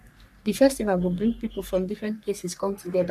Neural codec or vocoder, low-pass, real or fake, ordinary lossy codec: codec, 44.1 kHz, 3.4 kbps, Pupu-Codec; 14.4 kHz; fake; MP3, 96 kbps